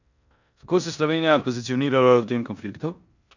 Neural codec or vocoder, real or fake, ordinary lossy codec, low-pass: codec, 16 kHz in and 24 kHz out, 0.9 kbps, LongCat-Audio-Codec, four codebook decoder; fake; none; 7.2 kHz